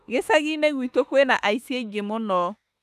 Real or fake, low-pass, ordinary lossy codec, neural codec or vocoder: fake; 14.4 kHz; none; autoencoder, 48 kHz, 32 numbers a frame, DAC-VAE, trained on Japanese speech